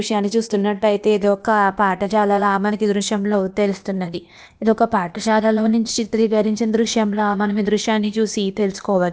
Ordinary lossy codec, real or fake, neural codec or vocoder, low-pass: none; fake; codec, 16 kHz, 0.8 kbps, ZipCodec; none